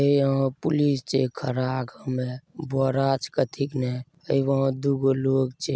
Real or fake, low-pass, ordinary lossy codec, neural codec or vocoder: real; none; none; none